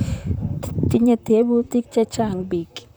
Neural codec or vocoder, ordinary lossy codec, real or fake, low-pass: vocoder, 44.1 kHz, 128 mel bands, Pupu-Vocoder; none; fake; none